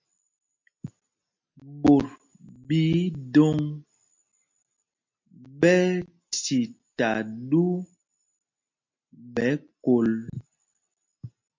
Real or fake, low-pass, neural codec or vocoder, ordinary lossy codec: real; 7.2 kHz; none; MP3, 48 kbps